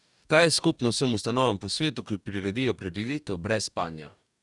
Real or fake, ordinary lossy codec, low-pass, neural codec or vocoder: fake; none; 10.8 kHz; codec, 44.1 kHz, 2.6 kbps, DAC